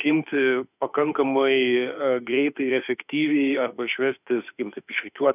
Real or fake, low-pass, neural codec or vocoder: fake; 3.6 kHz; autoencoder, 48 kHz, 32 numbers a frame, DAC-VAE, trained on Japanese speech